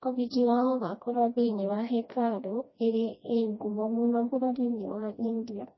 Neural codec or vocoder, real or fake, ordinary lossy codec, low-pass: codec, 16 kHz, 1 kbps, FreqCodec, smaller model; fake; MP3, 24 kbps; 7.2 kHz